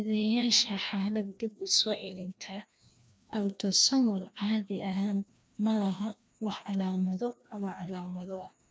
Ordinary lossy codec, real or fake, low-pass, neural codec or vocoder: none; fake; none; codec, 16 kHz, 1 kbps, FreqCodec, larger model